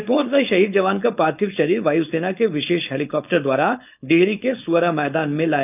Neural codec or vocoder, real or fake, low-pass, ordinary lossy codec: codec, 16 kHz, 4.8 kbps, FACodec; fake; 3.6 kHz; none